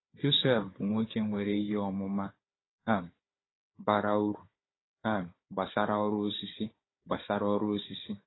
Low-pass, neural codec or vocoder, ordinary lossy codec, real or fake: 7.2 kHz; codec, 16 kHz, 4 kbps, FunCodec, trained on Chinese and English, 50 frames a second; AAC, 16 kbps; fake